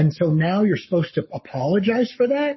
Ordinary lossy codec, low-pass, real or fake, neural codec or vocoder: MP3, 24 kbps; 7.2 kHz; fake; codec, 44.1 kHz, 7.8 kbps, Pupu-Codec